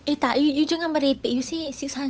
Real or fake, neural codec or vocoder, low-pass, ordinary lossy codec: fake; codec, 16 kHz, 8 kbps, FunCodec, trained on Chinese and English, 25 frames a second; none; none